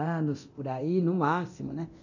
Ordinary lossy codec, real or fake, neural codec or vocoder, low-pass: none; fake; codec, 24 kHz, 0.9 kbps, DualCodec; 7.2 kHz